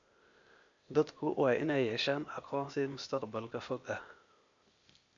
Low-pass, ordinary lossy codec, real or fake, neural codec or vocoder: 7.2 kHz; AAC, 64 kbps; fake; codec, 16 kHz, 0.8 kbps, ZipCodec